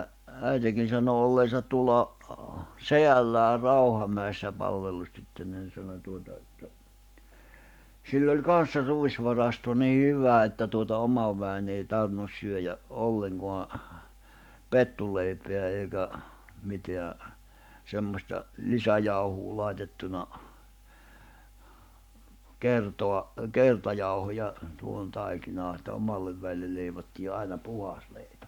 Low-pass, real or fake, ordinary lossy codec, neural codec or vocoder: 19.8 kHz; fake; none; codec, 44.1 kHz, 7.8 kbps, Pupu-Codec